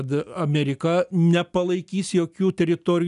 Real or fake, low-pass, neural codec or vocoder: real; 10.8 kHz; none